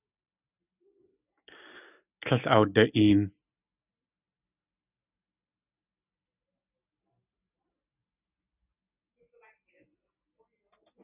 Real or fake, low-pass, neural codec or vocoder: real; 3.6 kHz; none